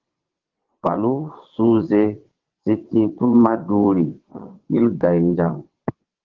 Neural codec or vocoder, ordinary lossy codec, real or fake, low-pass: vocoder, 22.05 kHz, 80 mel bands, WaveNeXt; Opus, 16 kbps; fake; 7.2 kHz